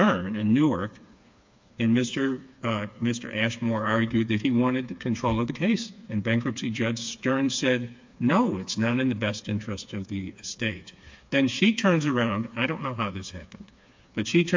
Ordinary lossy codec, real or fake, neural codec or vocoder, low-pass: MP3, 48 kbps; fake; codec, 16 kHz, 4 kbps, FreqCodec, smaller model; 7.2 kHz